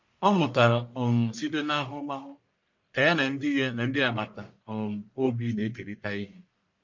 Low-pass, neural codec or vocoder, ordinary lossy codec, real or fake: 7.2 kHz; codec, 44.1 kHz, 1.7 kbps, Pupu-Codec; MP3, 48 kbps; fake